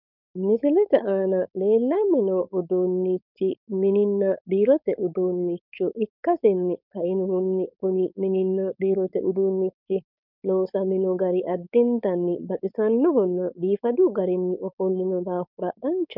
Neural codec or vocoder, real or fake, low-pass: codec, 16 kHz, 4.8 kbps, FACodec; fake; 5.4 kHz